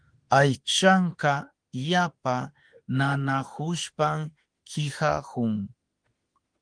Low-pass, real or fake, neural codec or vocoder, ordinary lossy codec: 9.9 kHz; fake; autoencoder, 48 kHz, 32 numbers a frame, DAC-VAE, trained on Japanese speech; Opus, 24 kbps